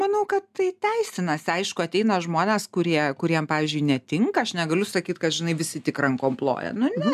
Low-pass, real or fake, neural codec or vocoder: 14.4 kHz; real; none